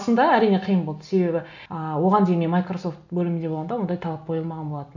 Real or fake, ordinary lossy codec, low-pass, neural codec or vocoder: real; none; 7.2 kHz; none